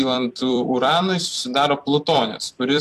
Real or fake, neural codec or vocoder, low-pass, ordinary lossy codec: fake; vocoder, 44.1 kHz, 128 mel bands every 256 samples, BigVGAN v2; 14.4 kHz; AAC, 64 kbps